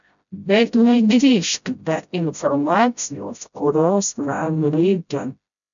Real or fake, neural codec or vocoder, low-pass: fake; codec, 16 kHz, 0.5 kbps, FreqCodec, smaller model; 7.2 kHz